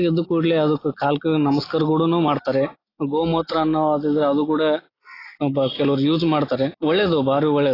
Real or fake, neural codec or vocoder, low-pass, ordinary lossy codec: real; none; 5.4 kHz; AAC, 24 kbps